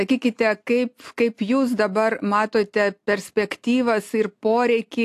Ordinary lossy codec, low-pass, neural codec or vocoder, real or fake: AAC, 64 kbps; 14.4 kHz; none; real